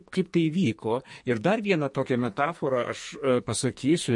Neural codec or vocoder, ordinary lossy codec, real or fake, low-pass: codec, 44.1 kHz, 2.6 kbps, SNAC; MP3, 48 kbps; fake; 10.8 kHz